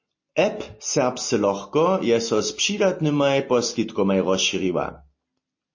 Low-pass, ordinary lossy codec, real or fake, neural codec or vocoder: 7.2 kHz; MP3, 32 kbps; real; none